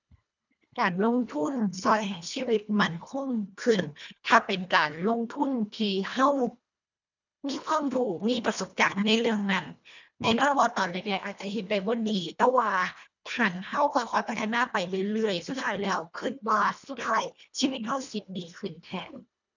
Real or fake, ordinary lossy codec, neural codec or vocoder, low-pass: fake; none; codec, 24 kHz, 1.5 kbps, HILCodec; 7.2 kHz